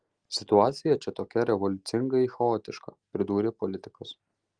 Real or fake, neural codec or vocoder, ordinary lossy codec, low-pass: real; none; Opus, 24 kbps; 9.9 kHz